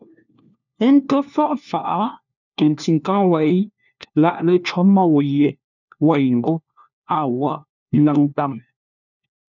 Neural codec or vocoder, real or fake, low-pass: codec, 16 kHz, 1 kbps, FunCodec, trained on LibriTTS, 50 frames a second; fake; 7.2 kHz